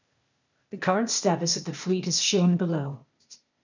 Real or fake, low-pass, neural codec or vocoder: fake; 7.2 kHz; codec, 16 kHz, 0.8 kbps, ZipCodec